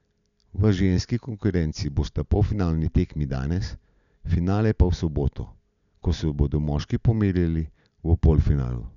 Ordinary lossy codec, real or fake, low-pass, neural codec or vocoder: none; real; 7.2 kHz; none